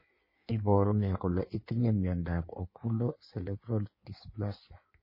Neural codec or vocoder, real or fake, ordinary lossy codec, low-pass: codec, 16 kHz in and 24 kHz out, 1.1 kbps, FireRedTTS-2 codec; fake; MP3, 24 kbps; 5.4 kHz